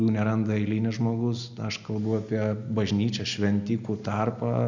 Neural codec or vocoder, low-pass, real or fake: none; 7.2 kHz; real